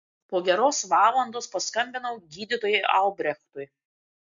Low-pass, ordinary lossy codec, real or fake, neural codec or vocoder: 7.2 kHz; MP3, 64 kbps; real; none